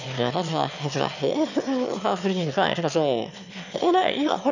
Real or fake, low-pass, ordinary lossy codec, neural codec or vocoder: fake; 7.2 kHz; none; autoencoder, 22.05 kHz, a latent of 192 numbers a frame, VITS, trained on one speaker